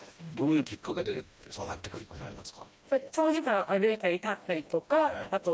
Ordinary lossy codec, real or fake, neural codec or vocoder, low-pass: none; fake; codec, 16 kHz, 1 kbps, FreqCodec, smaller model; none